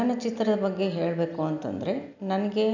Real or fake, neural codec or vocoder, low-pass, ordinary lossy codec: real; none; 7.2 kHz; none